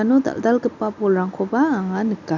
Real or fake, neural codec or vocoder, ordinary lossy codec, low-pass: real; none; none; 7.2 kHz